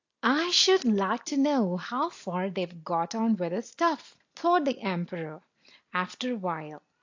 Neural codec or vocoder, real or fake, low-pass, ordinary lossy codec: none; real; 7.2 kHz; AAC, 48 kbps